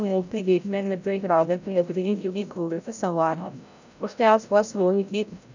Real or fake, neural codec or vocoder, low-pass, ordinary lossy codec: fake; codec, 16 kHz, 0.5 kbps, FreqCodec, larger model; 7.2 kHz; none